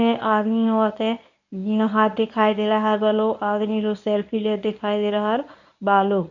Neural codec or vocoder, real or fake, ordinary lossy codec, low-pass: codec, 24 kHz, 0.9 kbps, WavTokenizer, medium speech release version 1; fake; none; 7.2 kHz